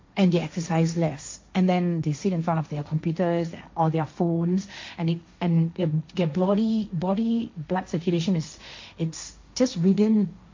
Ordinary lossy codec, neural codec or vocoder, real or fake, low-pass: MP3, 48 kbps; codec, 16 kHz, 1.1 kbps, Voila-Tokenizer; fake; 7.2 kHz